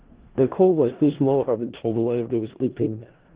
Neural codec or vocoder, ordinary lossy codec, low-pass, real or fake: codec, 16 kHz in and 24 kHz out, 0.4 kbps, LongCat-Audio-Codec, four codebook decoder; Opus, 16 kbps; 3.6 kHz; fake